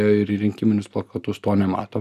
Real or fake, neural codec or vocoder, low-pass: real; none; 14.4 kHz